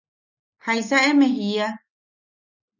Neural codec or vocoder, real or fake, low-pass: none; real; 7.2 kHz